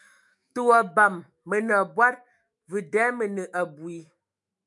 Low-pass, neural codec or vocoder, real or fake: 10.8 kHz; autoencoder, 48 kHz, 128 numbers a frame, DAC-VAE, trained on Japanese speech; fake